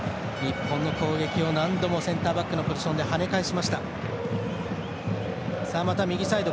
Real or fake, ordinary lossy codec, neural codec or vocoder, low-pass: real; none; none; none